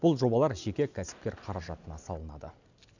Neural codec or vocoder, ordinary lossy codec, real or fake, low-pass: none; none; real; 7.2 kHz